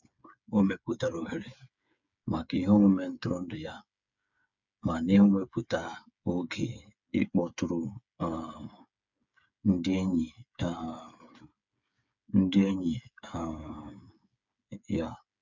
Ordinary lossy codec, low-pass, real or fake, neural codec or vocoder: none; 7.2 kHz; fake; codec, 16 kHz, 8 kbps, FreqCodec, smaller model